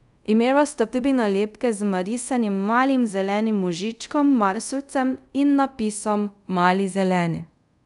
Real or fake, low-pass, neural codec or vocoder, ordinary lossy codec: fake; 10.8 kHz; codec, 24 kHz, 0.5 kbps, DualCodec; none